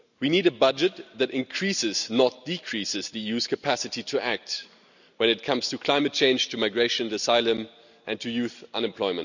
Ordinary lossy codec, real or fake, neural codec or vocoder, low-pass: none; real; none; 7.2 kHz